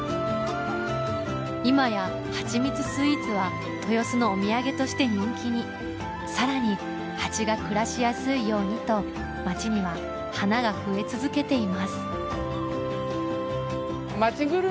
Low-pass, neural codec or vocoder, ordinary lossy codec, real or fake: none; none; none; real